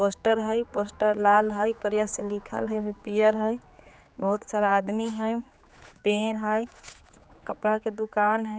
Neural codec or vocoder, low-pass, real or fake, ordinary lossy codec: codec, 16 kHz, 4 kbps, X-Codec, HuBERT features, trained on general audio; none; fake; none